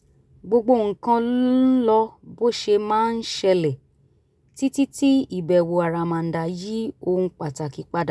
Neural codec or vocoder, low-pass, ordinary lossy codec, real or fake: none; none; none; real